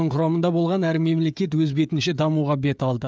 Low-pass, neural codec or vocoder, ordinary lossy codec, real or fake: none; codec, 16 kHz, 16 kbps, FreqCodec, smaller model; none; fake